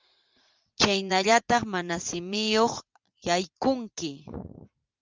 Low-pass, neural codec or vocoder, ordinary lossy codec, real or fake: 7.2 kHz; vocoder, 44.1 kHz, 80 mel bands, Vocos; Opus, 32 kbps; fake